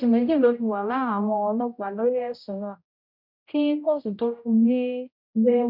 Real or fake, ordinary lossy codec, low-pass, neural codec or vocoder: fake; none; 5.4 kHz; codec, 16 kHz, 0.5 kbps, X-Codec, HuBERT features, trained on general audio